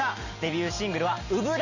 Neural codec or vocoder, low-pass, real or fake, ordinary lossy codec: none; 7.2 kHz; real; none